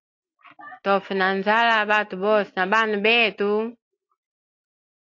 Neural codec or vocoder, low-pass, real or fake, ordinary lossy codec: none; 7.2 kHz; real; AAC, 48 kbps